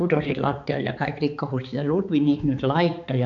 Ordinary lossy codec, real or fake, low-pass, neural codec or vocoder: none; fake; 7.2 kHz; codec, 16 kHz, 4 kbps, X-Codec, HuBERT features, trained on balanced general audio